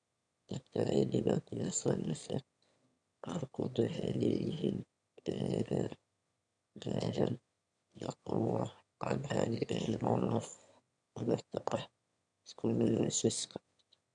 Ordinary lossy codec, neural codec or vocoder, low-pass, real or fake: none; autoencoder, 22.05 kHz, a latent of 192 numbers a frame, VITS, trained on one speaker; 9.9 kHz; fake